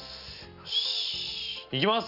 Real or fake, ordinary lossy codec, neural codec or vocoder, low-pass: real; none; none; 5.4 kHz